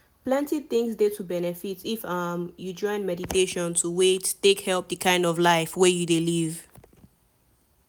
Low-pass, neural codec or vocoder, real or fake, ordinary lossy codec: none; none; real; none